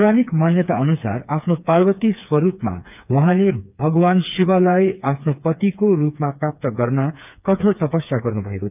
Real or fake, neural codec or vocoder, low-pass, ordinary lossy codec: fake; codec, 16 kHz, 4 kbps, FreqCodec, smaller model; 3.6 kHz; none